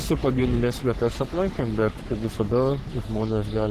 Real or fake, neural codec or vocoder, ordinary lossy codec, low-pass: fake; codec, 44.1 kHz, 3.4 kbps, Pupu-Codec; Opus, 16 kbps; 14.4 kHz